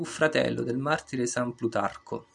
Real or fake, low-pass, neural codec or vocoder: real; 10.8 kHz; none